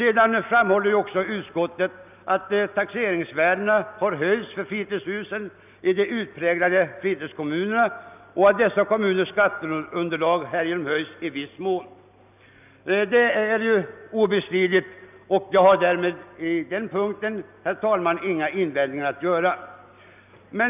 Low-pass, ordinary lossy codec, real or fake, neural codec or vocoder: 3.6 kHz; none; real; none